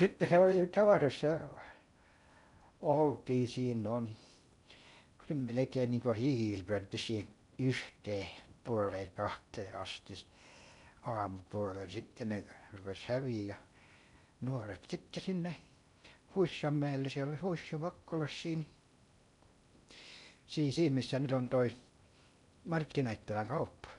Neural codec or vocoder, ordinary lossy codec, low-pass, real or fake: codec, 16 kHz in and 24 kHz out, 0.6 kbps, FocalCodec, streaming, 4096 codes; none; 10.8 kHz; fake